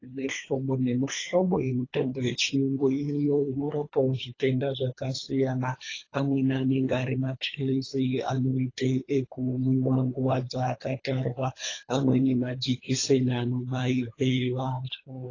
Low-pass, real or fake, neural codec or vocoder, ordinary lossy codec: 7.2 kHz; fake; codec, 24 kHz, 3 kbps, HILCodec; AAC, 32 kbps